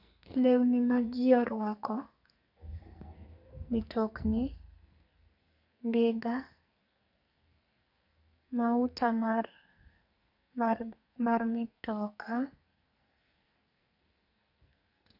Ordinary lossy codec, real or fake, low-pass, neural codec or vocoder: none; fake; 5.4 kHz; codec, 44.1 kHz, 2.6 kbps, SNAC